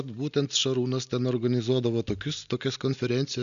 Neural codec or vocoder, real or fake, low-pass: none; real; 7.2 kHz